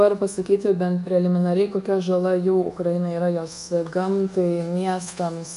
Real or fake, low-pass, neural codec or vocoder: fake; 10.8 kHz; codec, 24 kHz, 1.2 kbps, DualCodec